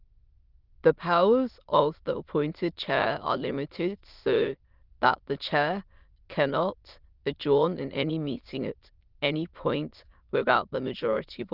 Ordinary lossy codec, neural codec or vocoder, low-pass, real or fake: Opus, 24 kbps; autoencoder, 22.05 kHz, a latent of 192 numbers a frame, VITS, trained on many speakers; 5.4 kHz; fake